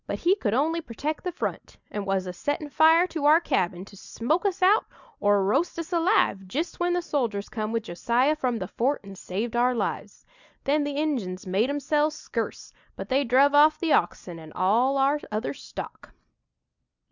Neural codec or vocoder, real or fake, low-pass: none; real; 7.2 kHz